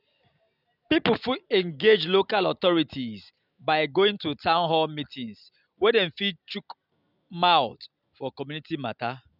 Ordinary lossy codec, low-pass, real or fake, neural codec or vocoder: none; 5.4 kHz; real; none